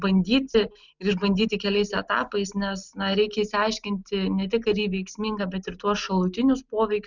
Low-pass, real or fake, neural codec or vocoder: 7.2 kHz; real; none